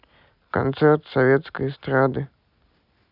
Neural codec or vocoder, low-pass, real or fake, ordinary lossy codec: none; 5.4 kHz; real; none